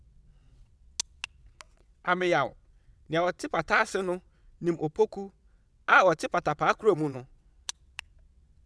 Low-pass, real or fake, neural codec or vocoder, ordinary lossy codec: none; fake; vocoder, 22.05 kHz, 80 mel bands, WaveNeXt; none